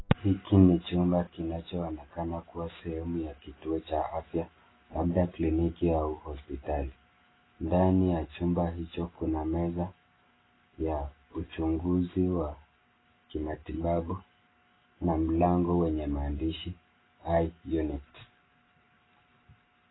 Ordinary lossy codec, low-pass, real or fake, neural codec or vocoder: AAC, 16 kbps; 7.2 kHz; real; none